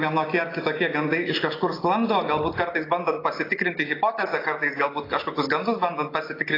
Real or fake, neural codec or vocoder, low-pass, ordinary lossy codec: real; none; 5.4 kHz; AAC, 24 kbps